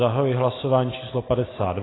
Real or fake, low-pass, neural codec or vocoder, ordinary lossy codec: real; 7.2 kHz; none; AAC, 16 kbps